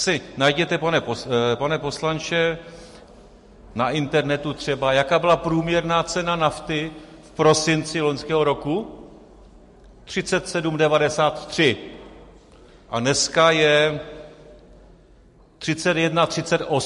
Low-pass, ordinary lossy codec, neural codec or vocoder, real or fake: 14.4 kHz; MP3, 48 kbps; none; real